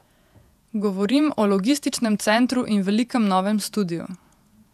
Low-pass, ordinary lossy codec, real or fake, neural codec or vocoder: 14.4 kHz; none; fake; vocoder, 48 kHz, 128 mel bands, Vocos